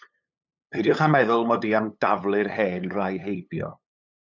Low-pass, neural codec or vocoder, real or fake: 7.2 kHz; codec, 16 kHz, 8 kbps, FunCodec, trained on LibriTTS, 25 frames a second; fake